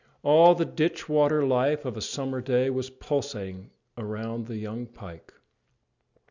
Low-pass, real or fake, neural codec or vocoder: 7.2 kHz; real; none